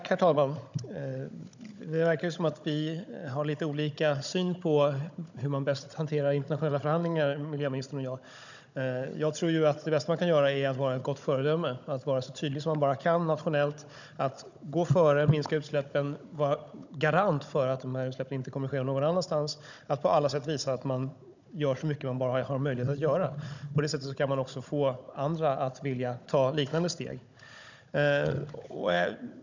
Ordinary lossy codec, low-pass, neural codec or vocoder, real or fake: none; 7.2 kHz; codec, 16 kHz, 16 kbps, FunCodec, trained on Chinese and English, 50 frames a second; fake